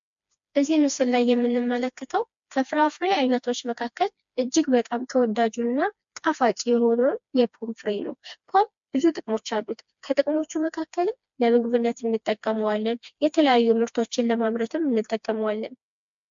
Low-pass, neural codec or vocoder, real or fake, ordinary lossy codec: 7.2 kHz; codec, 16 kHz, 2 kbps, FreqCodec, smaller model; fake; MP3, 64 kbps